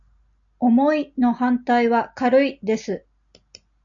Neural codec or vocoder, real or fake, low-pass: none; real; 7.2 kHz